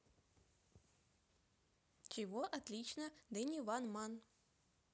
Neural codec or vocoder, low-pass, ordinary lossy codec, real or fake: none; none; none; real